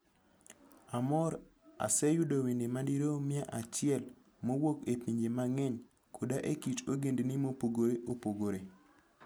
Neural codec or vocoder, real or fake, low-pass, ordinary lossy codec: none; real; none; none